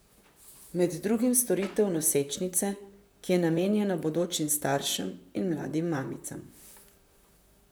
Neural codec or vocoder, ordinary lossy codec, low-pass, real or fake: vocoder, 44.1 kHz, 128 mel bands, Pupu-Vocoder; none; none; fake